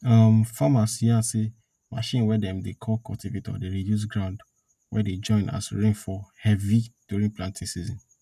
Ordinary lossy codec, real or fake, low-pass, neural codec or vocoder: none; real; 14.4 kHz; none